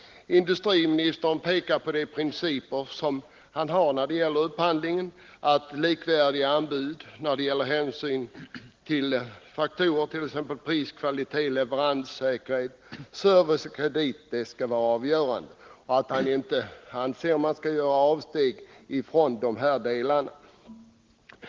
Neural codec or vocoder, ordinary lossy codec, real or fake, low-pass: none; Opus, 16 kbps; real; 7.2 kHz